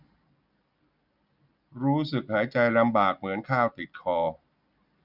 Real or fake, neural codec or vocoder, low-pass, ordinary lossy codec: real; none; 5.4 kHz; none